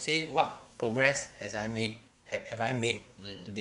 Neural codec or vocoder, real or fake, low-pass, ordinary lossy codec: codec, 24 kHz, 1 kbps, SNAC; fake; 10.8 kHz; none